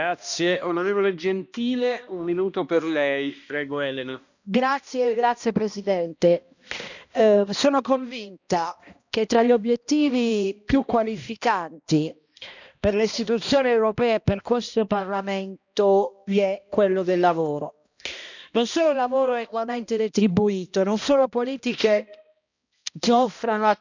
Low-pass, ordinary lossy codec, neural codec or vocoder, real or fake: 7.2 kHz; none; codec, 16 kHz, 1 kbps, X-Codec, HuBERT features, trained on balanced general audio; fake